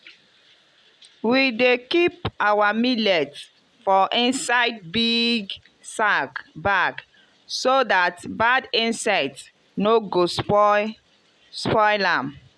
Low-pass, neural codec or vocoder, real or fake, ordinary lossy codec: none; none; real; none